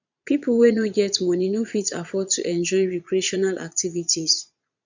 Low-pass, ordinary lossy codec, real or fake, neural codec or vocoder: 7.2 kHz; none; fake; vocoder, 22.05 kHz, 80 mel bands, Vocos